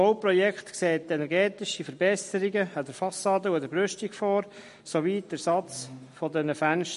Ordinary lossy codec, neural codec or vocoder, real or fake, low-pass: MP3, 48 kbps; none; real; 14.4 kHz